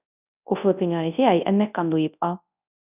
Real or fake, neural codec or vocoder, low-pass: fake; codec, 24 kHz, 0.9 kbps, WavTokenizer, large speech release; 3.6 kHz